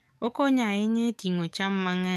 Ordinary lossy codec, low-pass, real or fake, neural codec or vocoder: none; 14.4 kHz; fake; codec, 44.1 kHz, 7.8 kbps, DAC